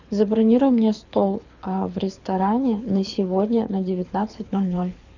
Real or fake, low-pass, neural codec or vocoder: fake; 7.2 kHz; codec, 24 kHz, 6 kbps, HILCodec